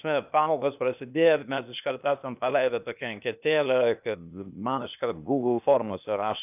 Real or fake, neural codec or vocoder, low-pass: fake; codec, 16 kHz, 0.8 kbps, ZipCodec; 3.6 kHz